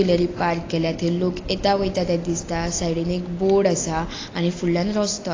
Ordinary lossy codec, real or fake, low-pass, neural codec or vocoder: AAC, 32 kbps; real; 7.2 kHz; none